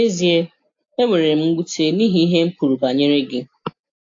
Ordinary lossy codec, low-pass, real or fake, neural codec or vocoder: AAC, 48 kbps; 7.2 kHz; real; none